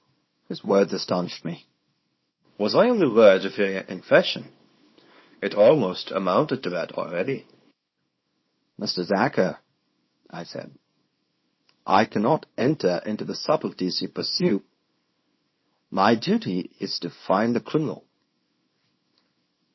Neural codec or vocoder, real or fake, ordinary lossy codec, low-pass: codec, 24 kHz, 0.9 kbps, WavTokenizer, small release; fake; MP3, 24 kbps; 7.2 kHz